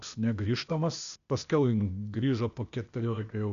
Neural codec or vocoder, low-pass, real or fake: codec, 16 kHz, 0.8 kbps, ZipCodec; 7.2 kHz; fake